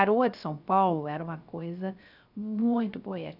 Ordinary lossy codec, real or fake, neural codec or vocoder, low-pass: none; fake; codec, 16 kHz, 0.3 kbps, FocalCodec; 5.4 kHz